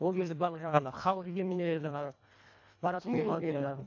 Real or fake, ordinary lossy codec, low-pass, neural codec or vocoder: fake; AAC, 48 kbps; 7.2 kHz; codec, 24 kHz, 1.5 kbps, HILCodec